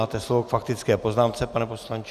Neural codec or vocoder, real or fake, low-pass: none; real; 14.4 kHz